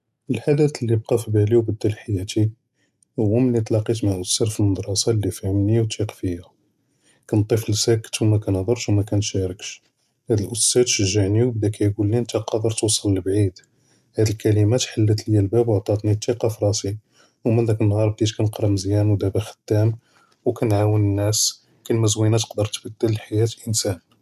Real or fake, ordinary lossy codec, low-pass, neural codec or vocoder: real; none; 14.4 kHz; none